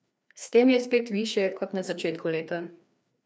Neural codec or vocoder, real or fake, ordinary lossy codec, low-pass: codec, 16 kHz, 2 kbps, FreqCodec, larger model; fake; none; none